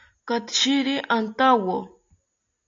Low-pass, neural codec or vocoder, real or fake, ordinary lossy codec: 7.2 kHz; none; real; AAC, 48 kbps